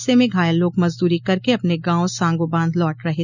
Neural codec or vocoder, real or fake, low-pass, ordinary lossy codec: none; real; 7.2 kHz; none